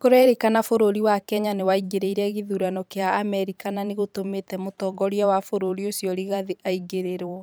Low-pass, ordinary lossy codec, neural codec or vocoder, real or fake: none; none; vocoder, 44.1 kHz, 128 mel bands every 512 samples, BigVGAN v2; fake